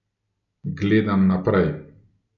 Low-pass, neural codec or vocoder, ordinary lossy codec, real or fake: 7.2 kHz; none; none; real